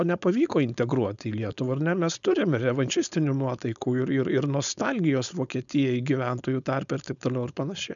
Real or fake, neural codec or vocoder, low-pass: fake; codec, 16 kHz, 4.8 kbps, FACodec; 7.2 kHz